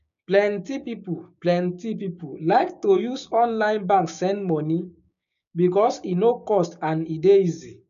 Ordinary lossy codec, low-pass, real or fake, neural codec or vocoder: none; 7.2 kHz; fake; codec, 16 kHz, 6 kbps, DAC